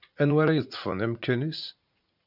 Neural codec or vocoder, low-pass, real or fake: vocoder, 44.1 kHz, 80 mel bands, Vocos; 5.4 kHz; fake